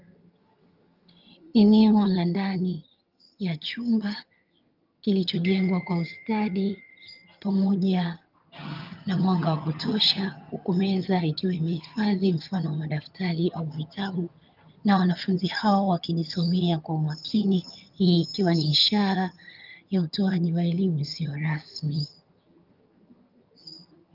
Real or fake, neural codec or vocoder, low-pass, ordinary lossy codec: fake; vocoder, 22.05 kHz, 80 mel bands, HiFi-GAN; 5.4 kHz; Opus, 24 kbps